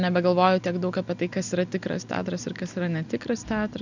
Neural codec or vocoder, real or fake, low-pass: none; real; 7.2 kHz